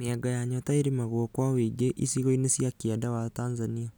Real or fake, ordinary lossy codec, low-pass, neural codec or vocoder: real; none; none; none